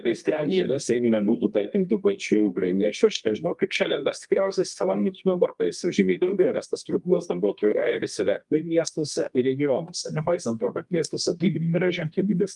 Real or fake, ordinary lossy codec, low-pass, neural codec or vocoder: fake; Opus, 32 kbps; 10.8 kHz; codec, 24 kHz, 0.9 kbps, WavTokenizer, medium music audio release